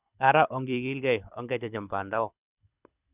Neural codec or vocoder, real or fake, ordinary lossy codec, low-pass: codec, 24 kHz, 6 kbps, HILCodec; fake; none; 3.6 kHz